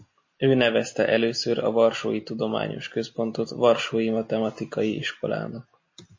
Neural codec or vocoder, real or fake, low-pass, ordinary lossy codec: none; real; 7.2 kHz; MP3, 32 kbps